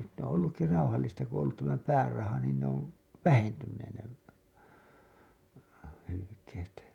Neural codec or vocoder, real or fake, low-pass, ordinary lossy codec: vocoder, 48 kHz, 128 mel bands, Vocos; fake; 19.8 kHz; none